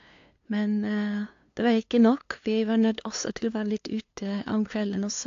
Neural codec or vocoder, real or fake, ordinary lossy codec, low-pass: codec, 16 kHz, 2 kbps, FunCodec, trained on LibriTTS, 25 frames a second; fake; AAC, 48 kbps; 7.2 kHz